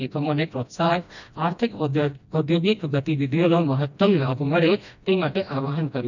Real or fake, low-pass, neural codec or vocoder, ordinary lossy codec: fake; 7.2 kHz; codec, 16 kHz, 1 kbps, FreqCodec, smaller model; none